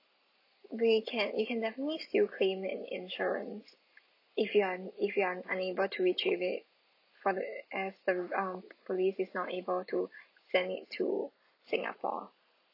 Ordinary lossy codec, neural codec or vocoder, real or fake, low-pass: AAC, 32 kbps; none; real; 5.4 kHz